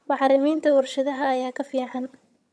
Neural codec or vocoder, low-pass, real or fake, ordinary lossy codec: vocoder, 22.05 kHz, 80 mel bands, HiFi-GAN; none; fake; none